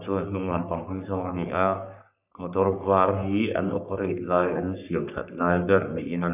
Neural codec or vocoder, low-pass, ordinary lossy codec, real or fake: codec, 44.1 kHz, 3.4 kbps, Pupu-Codec; 3.6 kHz; none; fake